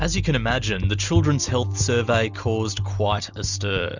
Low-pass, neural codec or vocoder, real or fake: 7.2 kHz; none; real